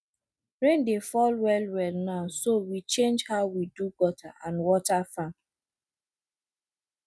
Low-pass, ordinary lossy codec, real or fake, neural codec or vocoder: none; none; real; none